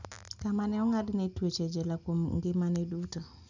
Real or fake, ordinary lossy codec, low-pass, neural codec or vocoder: real; none; 7.2 kHz; none